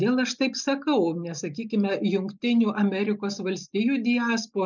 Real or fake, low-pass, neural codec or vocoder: real; 7.2 kHz; none